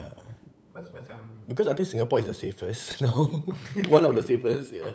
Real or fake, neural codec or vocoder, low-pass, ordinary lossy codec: fake; codec, 16 kHz, 8 kbps, FunCodec, trained on LibriTTS, 25 frames a second; none; none